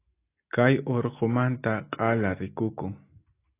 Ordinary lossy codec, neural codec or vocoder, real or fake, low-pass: AAC, 24 kbps; none; real; 3.6 kHz